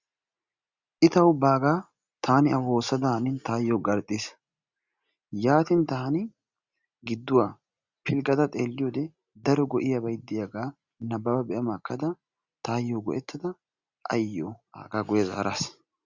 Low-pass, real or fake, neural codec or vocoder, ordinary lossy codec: 7.2 kHz; real; none; Opus, 64 kbps